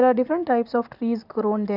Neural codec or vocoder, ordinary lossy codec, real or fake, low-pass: none; none; real; 5.4 kHz